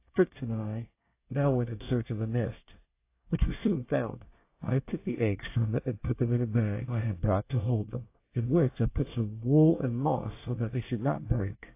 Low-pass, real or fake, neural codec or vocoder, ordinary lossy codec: 3.6 kHz; fake; codec, 24 kHz, 1 kbps, SNAC; AAC, 24 kbps